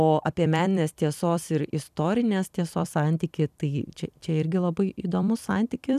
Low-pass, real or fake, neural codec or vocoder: 14.4 kHz; fake; vocoder, 44.1 kHz, 128 mel bands every 256 samples, BigVGAN v2